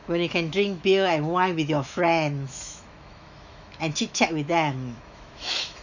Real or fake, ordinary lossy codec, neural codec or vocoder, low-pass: fake; none; autoencoder, 48 kHz, 128 numbers a frame, DAC-VAE, trained on Japanese speech; 7.2 kHz